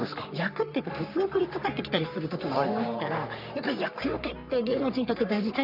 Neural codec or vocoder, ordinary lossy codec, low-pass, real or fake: codec, 44.1 kHz, 3.4 kbps, Pupu-Codec; none; 5.4 kHz; fake